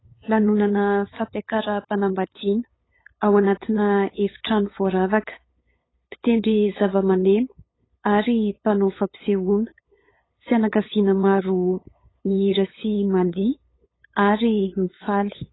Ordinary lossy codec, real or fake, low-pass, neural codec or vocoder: AAC, 16 kbps; fake; 7.2 kHz; codec, 16 kHz, 8 kbps, FunCodec, trained on LibriTTS, 25 frames a second